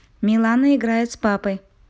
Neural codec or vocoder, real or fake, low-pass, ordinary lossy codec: none; real; none; none